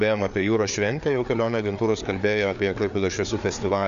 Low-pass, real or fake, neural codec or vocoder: 7.2 kHz; fake; codec, 16 kHz, 4 kbps, FreqCodec, larger model